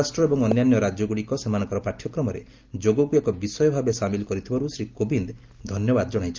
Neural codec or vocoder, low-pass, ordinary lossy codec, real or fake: none; 7.2 kHz; Opus, 24 kbps; real